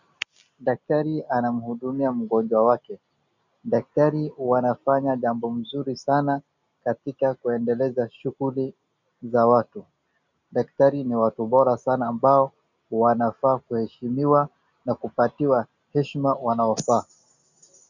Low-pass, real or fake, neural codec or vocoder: 7.2 kHz; real; none